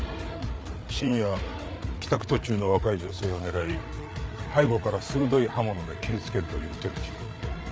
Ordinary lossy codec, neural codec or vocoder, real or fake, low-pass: none; codec, 16 kHz, 8 kbps, FreqCodec, larger model; fake; none